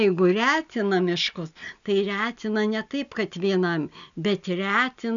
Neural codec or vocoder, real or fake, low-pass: none; real; 7.2 kHz